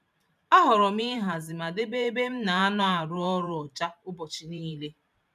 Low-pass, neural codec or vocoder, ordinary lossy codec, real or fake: 14.4 kHz; vocoder, 44.1 kHz, 128 mel bands every 512 samples, BigVGAN v2; none; fake